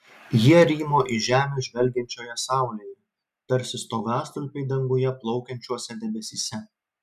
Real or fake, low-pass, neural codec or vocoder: real; 14.4 kHz; none